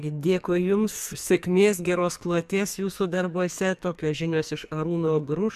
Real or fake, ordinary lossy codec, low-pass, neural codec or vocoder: fake; MP3, 96 kbps; 14.4 kHz; codec, 44.1 kHz, 2.6 kbps, SNAC